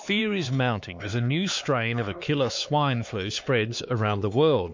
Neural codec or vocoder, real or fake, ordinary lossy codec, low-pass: codec, 16 kHz, 4 kbps, X-Codec, HuBERT features, trained on LibriSpeech; fake; MP3, 48 kbps; 7.2 kHz